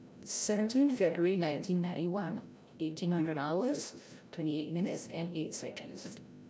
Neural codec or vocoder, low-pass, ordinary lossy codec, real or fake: codec, 16 kHz, 0.5 kbps, FreqCodec, larger model; none; none; fake